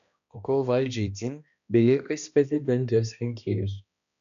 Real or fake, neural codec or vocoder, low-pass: fake; codec, 16 kHz, 1 kbps, X-Codec, HuBERT features, trained on balanced general audio; 7.2 kHz